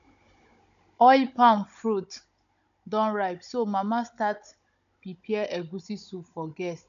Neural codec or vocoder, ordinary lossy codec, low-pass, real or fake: codec, 16 kHz, 16 kbps, FunCodec, trained on Chinese and English, 50 frames a second; none; 7.2 kHz; fake